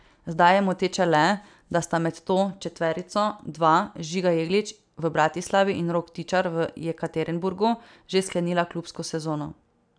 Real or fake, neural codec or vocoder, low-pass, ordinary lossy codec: real; none; 9.9 kHz; none